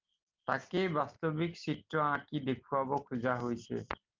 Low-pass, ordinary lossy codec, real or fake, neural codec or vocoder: 7.2 kHz; Opus, 32 kbps; real; none